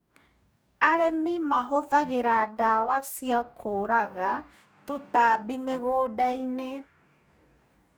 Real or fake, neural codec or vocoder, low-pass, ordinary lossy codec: fake; codec, 44.1 kHz, 2.6 kbps, DAC; none; none